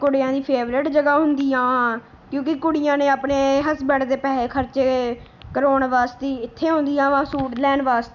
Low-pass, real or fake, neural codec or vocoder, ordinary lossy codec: 7.2 kHz; real; none; none